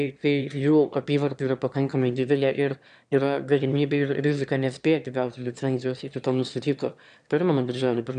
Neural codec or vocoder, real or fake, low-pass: autoencoder, 22.05 kHz, a latent of 192 numbers a frame, VITS, trained on one speaker; fake; 9.9 kHz